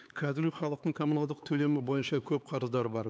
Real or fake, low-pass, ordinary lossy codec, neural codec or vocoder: fake; none; none; codec, 16 kHz, 4 kbps, X-Codec, HuBERT features, trained on LibriSpeech